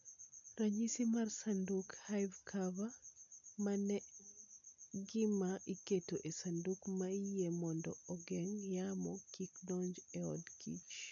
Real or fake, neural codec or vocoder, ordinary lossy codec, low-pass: real; none; none; 7.2 kHz